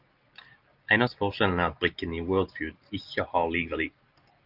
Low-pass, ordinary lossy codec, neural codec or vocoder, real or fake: 5.4 kHz; Opus, 24 kbps; none; real